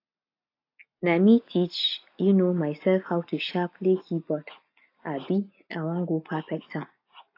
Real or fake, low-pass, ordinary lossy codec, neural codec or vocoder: real; 5.4 kHz; AAC, 32 kbps; none